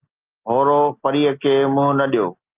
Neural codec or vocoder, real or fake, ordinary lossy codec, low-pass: none; real; Opus, 24 kbps; 3.6 kHz